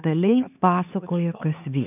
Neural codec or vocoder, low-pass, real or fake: codec, 16 kHz, 4 kbps, X-Codec, HuBERT features, trained on LibriSpeech; 3.6 kHz; fake